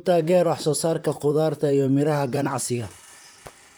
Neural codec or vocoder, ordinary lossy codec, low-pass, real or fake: vocoder, 44.1 kHz, 128 mel bands, Pupu-Vocoder; none; none; fake